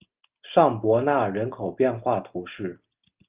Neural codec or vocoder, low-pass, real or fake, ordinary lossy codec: none; 3.6 kHz; real; Opus, 24 kbps